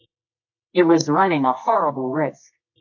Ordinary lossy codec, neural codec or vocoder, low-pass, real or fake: AAC, 48 kbps; codec, 24 kHz, 0.9 kbps, WavTokenizer, medium music audio release; 7.2 kHz; fake